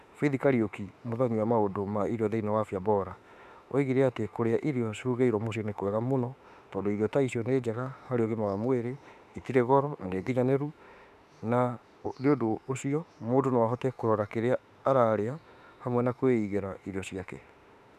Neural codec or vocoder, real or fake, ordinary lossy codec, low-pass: autoencoder, 48 kHz, 32 numbers a frame, DAC-VAE, trained on Japanese speech; fake; none; 14.4 kHz